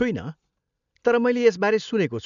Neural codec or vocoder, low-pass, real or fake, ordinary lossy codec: none; 7.2 kHz; real; none